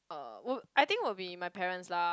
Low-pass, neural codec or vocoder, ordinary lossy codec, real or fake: none; none; none; real